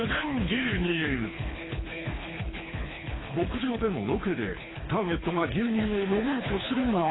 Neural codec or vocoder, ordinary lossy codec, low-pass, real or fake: codec, 24 kHz, 3 kbps, HILCodec; AAC, 16 kbps; 7.2 kHz; fake